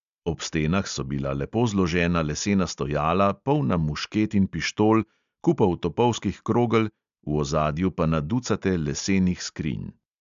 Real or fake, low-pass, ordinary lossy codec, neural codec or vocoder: real; 7.2 kHz; MP3, 64 kbps; none